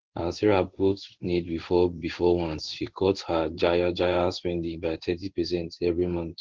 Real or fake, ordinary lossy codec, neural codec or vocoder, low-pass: fake; Opus, 16 kbps; codec, 16 kHz in and 24 kHz out, 1 kbps, XY-Tokenizer; 7.2 kHz